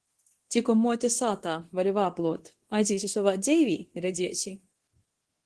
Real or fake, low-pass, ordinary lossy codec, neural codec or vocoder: fake; 10.8 kHz; Opus, 16 kbps; codec, 24 kHz, 0.9 kbps, DualCodec